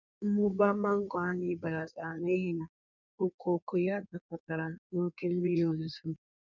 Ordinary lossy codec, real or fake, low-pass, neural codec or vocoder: none; fake; 7.2 kHz; codec, 16 kHz in and 24 kHz out, 1.1 kbps, FireRedTTS-2 codec